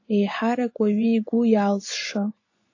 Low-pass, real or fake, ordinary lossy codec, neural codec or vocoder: 7.2 kHz; fake; AAC, 48 kbps; vocoder, 24 kHz, 100 mel bands, Vocos